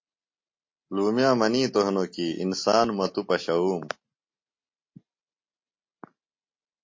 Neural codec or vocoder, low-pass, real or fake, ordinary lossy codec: none; 7.2 kHz; real; MP3, 32 kbps